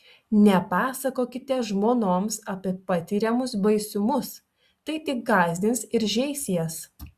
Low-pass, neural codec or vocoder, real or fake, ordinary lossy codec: 14.4 kHz; none; real; Opus, 64 kbps